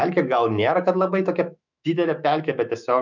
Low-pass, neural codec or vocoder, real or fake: 7.2 kHz; autoencoder, 48 kHz, 128 numbers a frame, DAC-VAE, trained on Japanese speech; fake